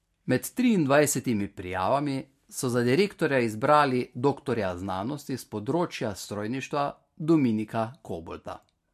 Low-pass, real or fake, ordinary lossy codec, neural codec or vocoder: 14.4 kHz; real; MP3, 64 kbps; none